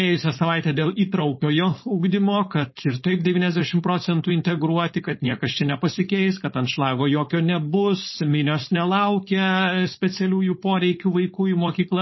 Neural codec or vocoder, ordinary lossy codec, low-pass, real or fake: codec, 16 kHz, 4.8 kbps, FACodec; MP3, 24 kbps; 7.2 kHz; fake